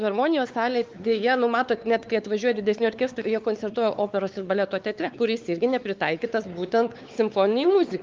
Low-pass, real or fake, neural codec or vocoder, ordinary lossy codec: 7.2 kHz; fake; codec, 16 kHz, 4 kbps, X-Codec, WavLM features, trained on Multilingual LibriSpeech; Opus, 32 kbps